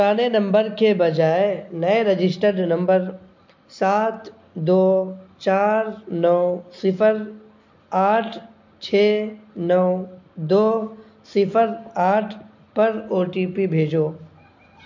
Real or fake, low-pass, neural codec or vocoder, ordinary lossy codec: real; 7.2 kHz; none; MP3, 48 kbps